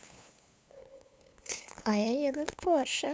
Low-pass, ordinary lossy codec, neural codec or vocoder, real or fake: none; none; codec, 16 kHz, 2 kbps, FunCodec, trained on LibriTTS, 25 frames a second; fake